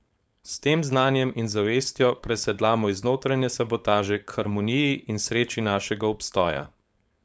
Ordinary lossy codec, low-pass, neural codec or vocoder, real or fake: none; none; codec, 16 kHz, 4.8 kbps, FACodec; fake